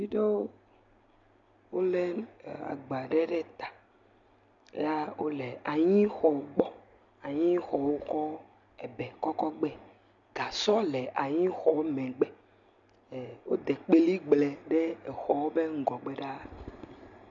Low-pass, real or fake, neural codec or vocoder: 7.2 kHz; real; none